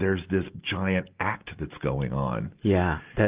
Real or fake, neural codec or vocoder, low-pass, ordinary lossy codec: real; none; 3.6 kHz; Opus, 24 kbps